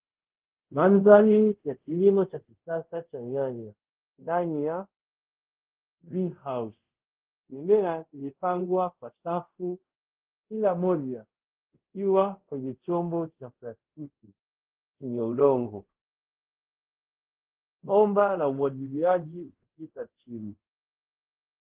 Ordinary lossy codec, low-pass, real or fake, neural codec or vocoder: Opus, 16 kbps; 3.6 kHz; fake; codec, 24 kHz, 0.5 kbps, DualCodec